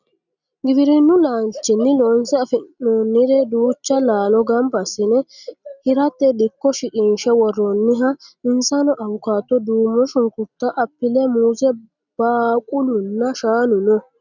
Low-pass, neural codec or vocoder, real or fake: 7.2 kHz; none; real